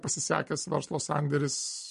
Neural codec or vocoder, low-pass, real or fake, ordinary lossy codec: none; 14.4 kHz; real; MP3, 48 kbps